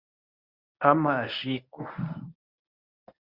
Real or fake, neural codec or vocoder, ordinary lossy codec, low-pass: fake; codec, 24 kHz, 0.9 kbps, WavTokenizer, medium speech release version 1; Opus, 64 kbps; 5.4 kHz